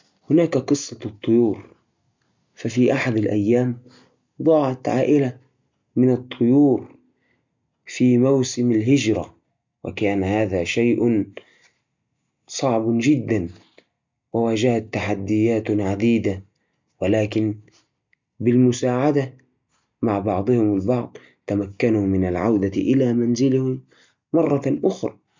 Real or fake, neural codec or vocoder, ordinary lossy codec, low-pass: real; none; MP3, 64 kbps; 7.2 kHz